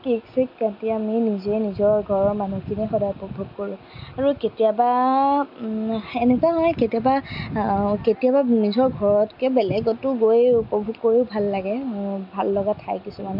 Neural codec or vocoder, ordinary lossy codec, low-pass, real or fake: none; MP3, 48 kbps; 5.4 kHz; real